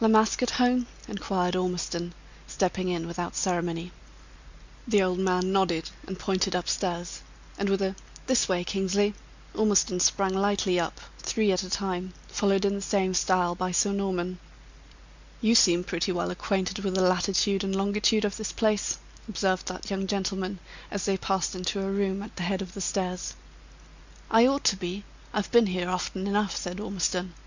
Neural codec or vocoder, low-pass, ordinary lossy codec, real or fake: none; 7.2 kHz; Opus, 64 kbps; real